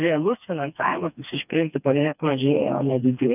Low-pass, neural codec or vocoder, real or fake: 3.6 kHz; codec, 16 kHz, 2 kbps, FreqCodec, smaller model; fake